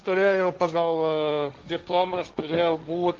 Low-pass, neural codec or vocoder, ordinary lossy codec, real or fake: 7.2 kHz; codec, 16 kHz, 1.1 kbps, Voila-Tokenizer; Opus, 32 kbps; fake